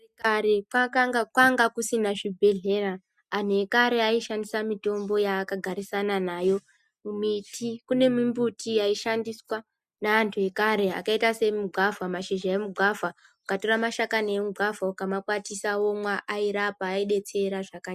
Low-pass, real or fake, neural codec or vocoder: 14.4 kHz; real; none